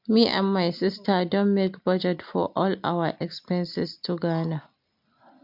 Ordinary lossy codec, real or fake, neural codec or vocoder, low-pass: MP3, 48 kbps; real; none; 5.4 kHz